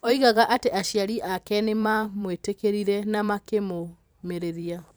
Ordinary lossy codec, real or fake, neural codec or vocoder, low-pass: none; fake; vocoder, 44.1 kHz, 128 mel bands every 512 samples, BigVGAN v2; none